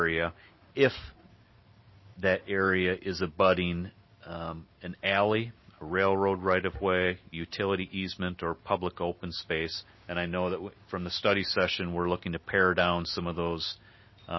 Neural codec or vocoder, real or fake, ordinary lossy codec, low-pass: none; real; MP3, 24 kbps; 7.2 kHz